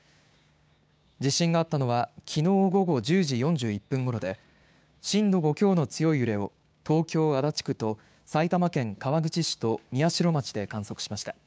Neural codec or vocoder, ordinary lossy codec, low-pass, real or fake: codec, 16 kHz, 6 kbps, DAC; none; none; fake